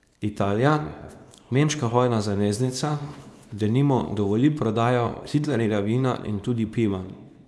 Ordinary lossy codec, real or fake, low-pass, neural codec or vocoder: none; fake; none; codec, 24 kHz, 0.9 kbps, WavTokenizer, small release